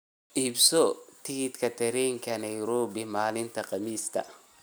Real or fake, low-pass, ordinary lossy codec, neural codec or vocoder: real; none; none; none